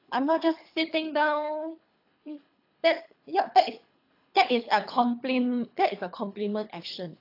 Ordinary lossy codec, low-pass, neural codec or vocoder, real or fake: AAC, 32 kbps; 5.4 kHz; codec, 24 kHz, 3 kbps, HILCodec; fake